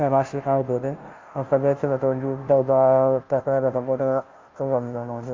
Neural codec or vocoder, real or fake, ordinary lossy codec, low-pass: codec, 16 kHz, 0.5 kbps, FunCodec, trained on Chinese and English, 25 frames a second; fake; Opus, 24 kbps; 7.2 kHz